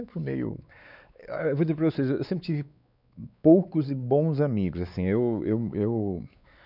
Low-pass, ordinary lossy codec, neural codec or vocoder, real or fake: 5.4 kHz; none; codec, 16 kHz, 4 kbps, X-Codec, WavLM features, trained on Multilingual LibriSpeech; fake